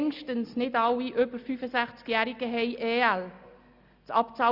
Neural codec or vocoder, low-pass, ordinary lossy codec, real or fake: none; 5.4 kHz; Opus, 64 kbps; real